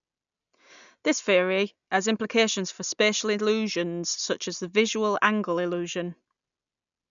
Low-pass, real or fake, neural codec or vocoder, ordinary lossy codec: 7.2 kHz; real; none; none